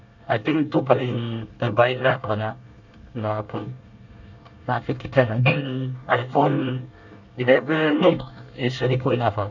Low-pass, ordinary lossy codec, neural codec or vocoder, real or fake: 7.2 kHz; none; codec, 24 kHz, 1 kbps, SNAC; fake